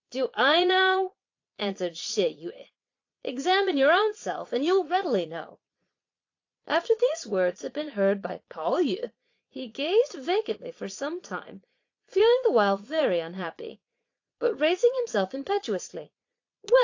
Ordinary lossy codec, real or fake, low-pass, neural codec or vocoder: AAC, 48 kbps; fake; 7.2 kHz; vocoder, 22.05 kHz, 80 mel bands, Vocos